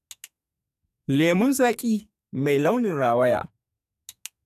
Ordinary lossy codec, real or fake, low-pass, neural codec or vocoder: none; fake; 14.4 kHz; codec, 44.1 kHz, 2.6 kbps, SNAC